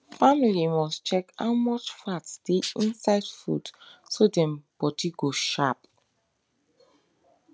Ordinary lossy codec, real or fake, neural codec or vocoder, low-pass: none; real; none; none